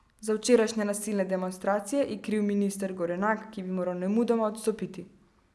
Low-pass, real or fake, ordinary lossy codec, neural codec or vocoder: none; real; none; none